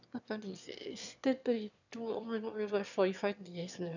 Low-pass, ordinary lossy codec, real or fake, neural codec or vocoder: 7.2 kHz; none; fake; autoencoder, 22.05 kHz, a latent of 192 numbers a frame, VITS, trained on one speaker